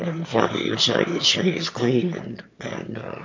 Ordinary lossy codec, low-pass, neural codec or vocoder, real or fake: MP3, 48 kbps; 7.2 kHz; autoencoder, 22.05 kHz, a latent of 192 numbers a frame, VITS, trained on one speaker; fake